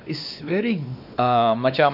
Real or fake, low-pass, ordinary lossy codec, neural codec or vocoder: fake; 5.4 kHz; none; codec, 16 kHz, 2 kbps, X-Codec, WavLM features, trained on Multilingual LibriSpeech